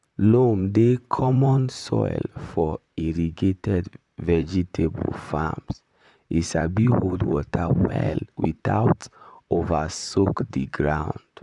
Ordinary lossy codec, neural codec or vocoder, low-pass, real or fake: none; vocoder, 44.1 kHz, 128 mel bands, Pupu-Vocoder; 10.8 kHz; fake